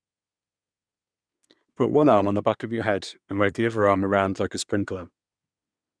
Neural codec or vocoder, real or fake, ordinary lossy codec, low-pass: codec, 24 kHz, 1 kbps, SNAC; fake; none; 9.9 kHz